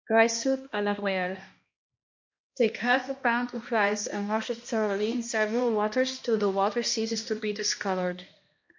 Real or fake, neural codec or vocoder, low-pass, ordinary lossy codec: fake; codec, 16 kHz, 1 kbps, X-Codec, HuBERT features, trained on balanced general audio; 7.2 kHz; MP3, 48 kbps